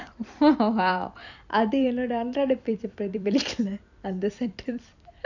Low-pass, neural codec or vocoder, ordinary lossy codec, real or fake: 7.2 kHz; vocoder, 44.1 kHz, 128 mel bands every 256 samples, BigVGAN v2; none; fake